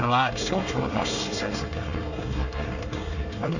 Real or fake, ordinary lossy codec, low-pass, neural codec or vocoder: fake; none; 7.2 kHz; codec, 24 kHz, 1 kbps, SNAC